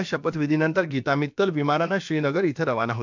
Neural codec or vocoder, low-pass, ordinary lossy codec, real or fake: codec, 16 kHz, about 1 kbps, DyCAST, with the encoder's durations; 7.2 kHz; MP3, 64 kbps; fake